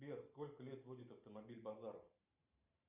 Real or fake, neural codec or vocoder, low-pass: fake; vocoder, 44.1 kHz, 128 mel bands every 512 samples, BigVGAN v2; 3.6 kHz